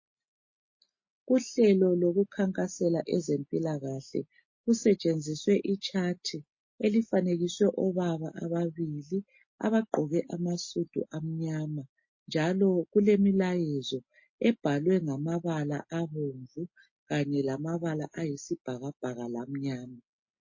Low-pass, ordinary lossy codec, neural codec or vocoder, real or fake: 7.2 kHz; MP3, 32 kbps; none; real